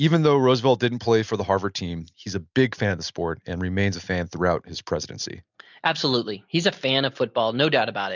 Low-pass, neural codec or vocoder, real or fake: 7.2 kHz; none; real